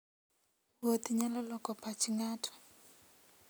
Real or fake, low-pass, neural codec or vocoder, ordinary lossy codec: real; none; none; none